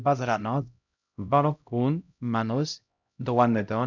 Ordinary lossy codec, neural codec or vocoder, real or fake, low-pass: none; codec, 16 kHz, 0.5 kbps, X-Codec, HuBERT features, trained on LibriSpeech; fake; 7.2 kHz